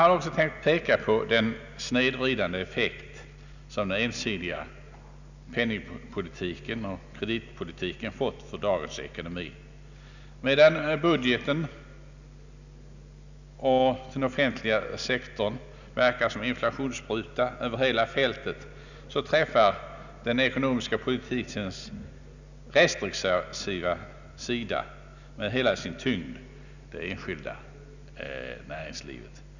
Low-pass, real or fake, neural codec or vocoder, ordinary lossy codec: 7.2 kHz; real; none; none